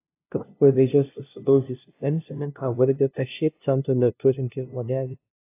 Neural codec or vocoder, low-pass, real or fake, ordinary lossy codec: codec, 16 kHz, 0.5 kbps, FunCodec, trained on LibriTTS, 25 frames a second; 3.6 kHz; fake; AAC, 24 kbps